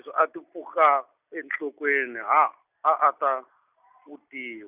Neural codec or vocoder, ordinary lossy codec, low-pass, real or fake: none; none; 3.6 kHz; real